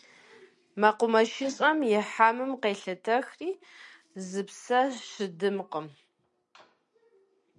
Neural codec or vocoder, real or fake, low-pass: none; real; 10.8 kHz